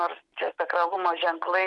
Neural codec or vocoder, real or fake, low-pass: none; real; 10.8 kHz